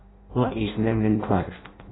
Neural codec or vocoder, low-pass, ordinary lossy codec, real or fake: codec, 16 kHz in and 24 kHz out, 0.6 kbps, FireRedTTS-2 codec; 7.2 kHz; AAC, 16 kbps; fake